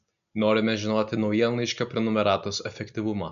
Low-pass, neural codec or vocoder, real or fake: 7.2 kHz; none; real